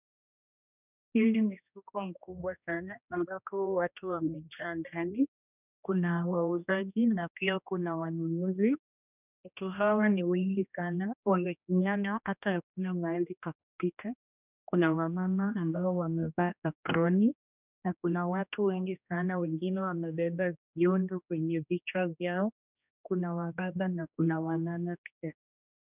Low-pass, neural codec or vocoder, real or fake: 3.6 kHz; codec, 16 kHz, 1 kbps, X-Codec, HuBERT features, trained on general audio; fake